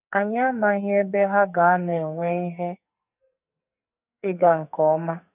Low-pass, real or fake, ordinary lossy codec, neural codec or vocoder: 3.6 kHz; fake; none; codec, 44.1 kHz, 2.6 kbps, SNAC